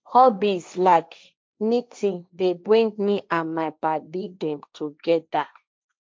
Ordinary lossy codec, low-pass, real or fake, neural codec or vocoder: none; none; fake; codec, 16 kHz, 1.1 kbps, Voila-Tokenizer